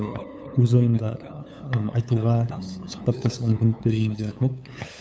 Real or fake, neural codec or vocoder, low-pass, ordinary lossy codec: fake; codec, 16 kHz, 8 kbps, FunCodec, trained on LibriTTS, 25 frames a second; none; none